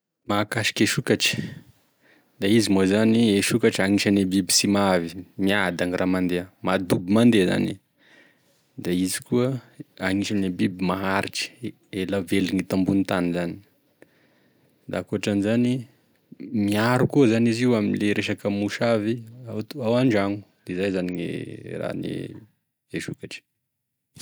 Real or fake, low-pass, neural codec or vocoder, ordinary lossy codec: real; none; none; none